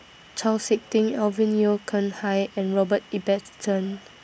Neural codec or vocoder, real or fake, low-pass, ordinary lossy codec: none; real; none; none